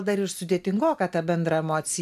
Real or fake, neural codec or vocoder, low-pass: real; none; 14.4 kHz